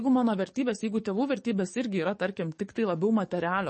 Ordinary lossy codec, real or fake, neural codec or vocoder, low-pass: MP3, 32 kbps; fake; codec, 44.1 kHz, 7.8 kbps, DAC; 10.8 kHz